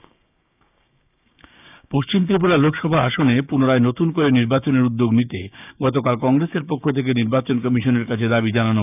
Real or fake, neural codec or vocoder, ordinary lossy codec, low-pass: fake; codec, 16 kHz, 6 kbps, DAC; none; 3.6 kHz